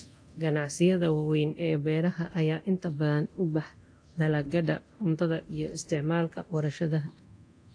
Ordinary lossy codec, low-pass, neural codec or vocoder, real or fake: AAC, 48 kbps; 9.9 kHz; codec, 24 kHz, 0.9 kbps, DualCodec; fake